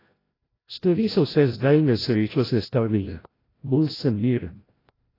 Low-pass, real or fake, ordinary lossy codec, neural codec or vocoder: 5.4 kHz; fake; AAC, 24 kbps; codec, 16 kHz, 0.5 kbps, FreqCodec, larger model